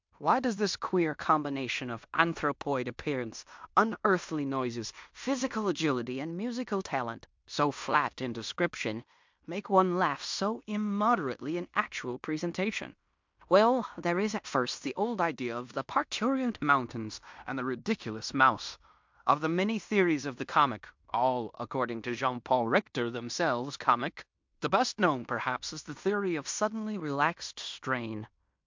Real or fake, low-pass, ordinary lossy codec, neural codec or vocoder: fake; 7.2 kHz; MP3, 64 kbps; codec, 16 kHz in and 24 kHz out, 0.9 kbps, LongCat-Audio-Codec, fine tuned four codebook decoder